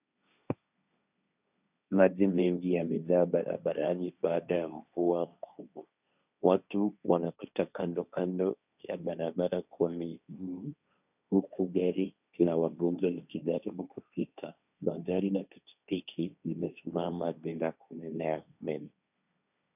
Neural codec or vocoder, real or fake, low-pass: codec, 16 kHz, 1.1 kbps, Voila-Tokenizer; fake; 3.6 kHz